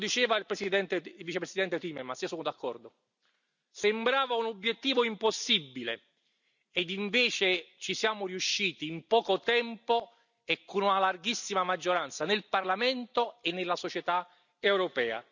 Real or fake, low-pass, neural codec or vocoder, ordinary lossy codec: real; 7.2 kHz; none; none